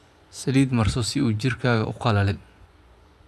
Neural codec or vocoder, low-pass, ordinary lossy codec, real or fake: vocoder, 24 kHz, 100 mel bands, Vocos; none; none; fake